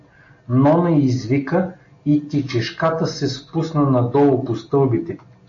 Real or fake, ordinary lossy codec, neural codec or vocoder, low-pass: real; AAC, 48 kbps; none; 7.2 kHz